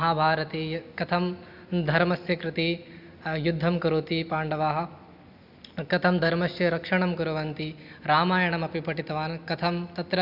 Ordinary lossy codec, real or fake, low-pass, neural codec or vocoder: none; real; 5.4 kHz; none